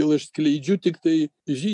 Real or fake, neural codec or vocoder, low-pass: real; none; 10.8 kHz